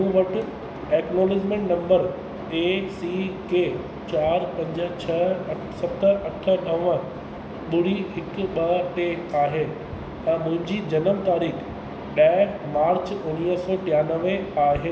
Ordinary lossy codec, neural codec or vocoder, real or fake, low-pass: none; none; real; none